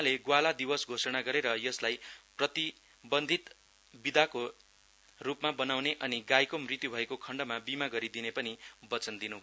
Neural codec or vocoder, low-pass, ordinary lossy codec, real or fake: none; none; none; real